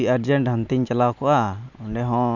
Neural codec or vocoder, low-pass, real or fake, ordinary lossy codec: none; 7.2 kHz; real; none